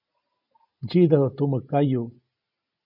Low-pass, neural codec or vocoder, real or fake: 5.4 kHz; none; real